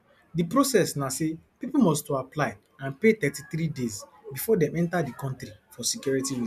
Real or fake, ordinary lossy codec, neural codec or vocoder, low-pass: real; none; none; 14.4 kHz